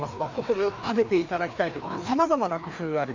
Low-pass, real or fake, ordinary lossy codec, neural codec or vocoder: 7.2 kHz; fake; MP3, 64 kbps; codec, 16 kHz, 2 kbps, FreqCodec, larger model